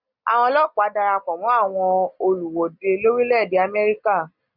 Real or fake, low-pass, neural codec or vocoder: real; 5.4 kHz; none